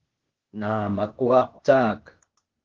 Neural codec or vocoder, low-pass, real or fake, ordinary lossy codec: codec, 16 kHz, 0.8 kbps, ZipCodec; 7.2 kHz; fake; Opus, 16 kbps